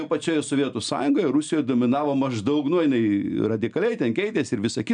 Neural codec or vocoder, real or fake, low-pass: none; real; 9.9 kHz